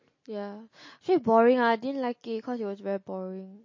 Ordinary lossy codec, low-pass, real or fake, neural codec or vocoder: MP3, 32 kbps; 7.2 kHz; real; none